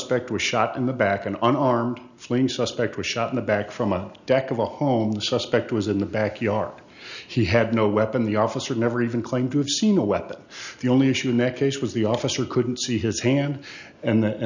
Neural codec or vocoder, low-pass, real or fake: none; 7.2 kHz; real